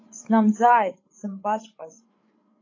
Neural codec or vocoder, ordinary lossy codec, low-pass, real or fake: codec, 16 kHz, 8 kbps, FreqCodec, larger model; AAC, 32 kbps; 7.2 kHz; fake